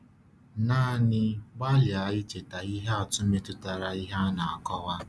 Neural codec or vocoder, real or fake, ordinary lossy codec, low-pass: none; real; none; none